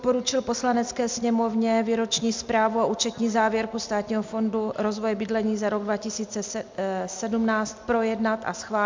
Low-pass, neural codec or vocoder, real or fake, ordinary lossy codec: 7.2 kHz; none; real; MP3, 64 kbps